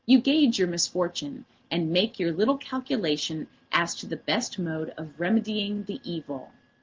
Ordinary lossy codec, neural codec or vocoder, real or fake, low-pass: Opus, 16 kbps; none; real; 7.2 kHz